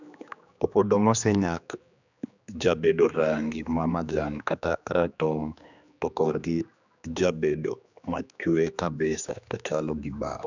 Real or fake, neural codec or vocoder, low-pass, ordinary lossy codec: fake; codec, 16 kHz, 2 kbps, X-Codec, HuBERT features, trained on general audio; 7.2 kHz; none